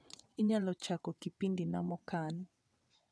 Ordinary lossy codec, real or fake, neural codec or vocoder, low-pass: none; fake; vocoder, 22.05 kHz, 80 mel bands, WaveNeXt; none